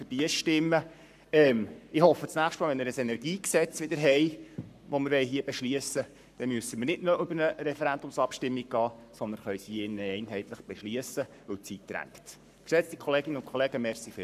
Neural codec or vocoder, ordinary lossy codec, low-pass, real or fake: codec, 44.1 kHz, 7.8 kbps, Pupu-Codec; none; 14.4 kHz; fake